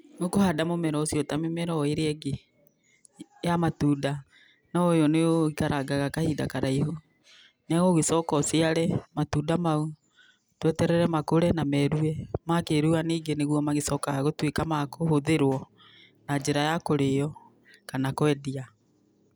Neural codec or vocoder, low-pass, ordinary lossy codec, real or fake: none; none; none; real